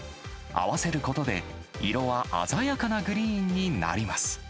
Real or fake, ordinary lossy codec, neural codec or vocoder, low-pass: real; none; none; none